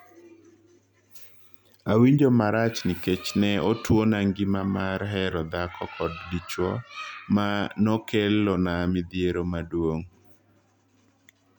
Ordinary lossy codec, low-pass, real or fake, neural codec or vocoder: none; 19.8 kHz; real; none